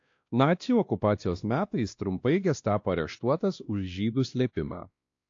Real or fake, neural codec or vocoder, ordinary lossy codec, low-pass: fake; codec, 16 kHz, 1 kbps, X-Codec, WavLM features, trained on Multilingual LibriSpeech; AAC, 48 kbps; 7.2 kHz